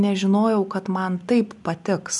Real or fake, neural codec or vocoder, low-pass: real; none; 10.8 kHz